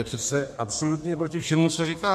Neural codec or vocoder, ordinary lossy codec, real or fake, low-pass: codec, 32 kHz, 1.9 kbps, SNAC; MP3, 64 kbps; fake; 14.4 kHz